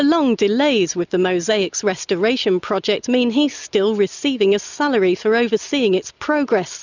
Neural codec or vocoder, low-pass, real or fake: none; 7.2 kHz; real